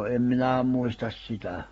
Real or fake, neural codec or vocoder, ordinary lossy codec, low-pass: fake; codec, 44.1 kHz, 7.8 kbps, Pupu-Codec; AAC, 24 kbps; 19.8 kHz